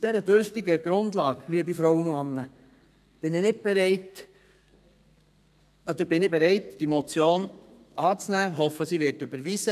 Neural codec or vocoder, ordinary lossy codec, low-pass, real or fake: codec, 32 kHz, 1.9 kbps, SNAC; none; 14.4 kHz; fake